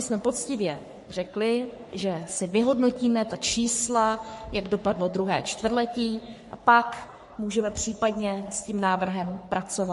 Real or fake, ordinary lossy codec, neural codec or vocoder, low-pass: fake; MP3, 48 kbps; codec, 44.1 kHz, 3.4 kbps, Pupu-Codec; 14.4 kHz